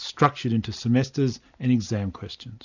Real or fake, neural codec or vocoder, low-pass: real; none; 7.2 kHz